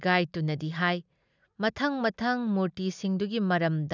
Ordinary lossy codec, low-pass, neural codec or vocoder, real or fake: none; 7.2 kHz; none; real